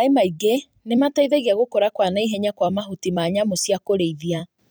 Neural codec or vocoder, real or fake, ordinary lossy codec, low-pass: vocoder, 44.1 kHz, 128 mel bands every 256 samples, BigVGAN v2; fake; none; none